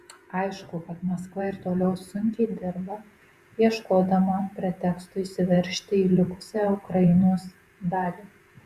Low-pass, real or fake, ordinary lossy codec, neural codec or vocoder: 14.4 kHz; fake; Opus, 64 kbps; vocoder, 48 kHz, 128 mel bands, Vocos